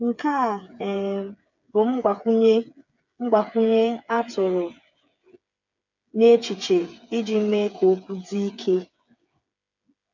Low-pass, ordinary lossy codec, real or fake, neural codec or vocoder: 7.2 kHz; none; fake; codec, 16 kHz, 8 kbps, FreqCodec, smaller model